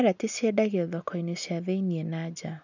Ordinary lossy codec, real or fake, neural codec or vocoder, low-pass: none; real; none; 7.2 kHz